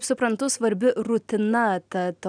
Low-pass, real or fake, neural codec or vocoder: 9.9 kHz; real; none